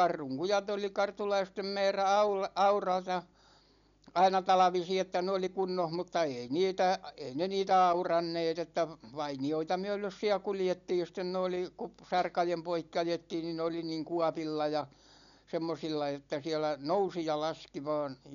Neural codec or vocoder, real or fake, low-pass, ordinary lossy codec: none; real; 7.2 kHz; none